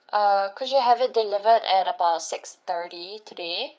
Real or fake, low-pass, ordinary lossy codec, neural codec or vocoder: fake; none; none; codec, 16 kHz, 4 kbps, FreqCodec, larger model